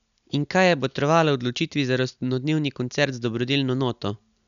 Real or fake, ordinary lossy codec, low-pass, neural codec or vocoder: real; none; 7.2 kHz; none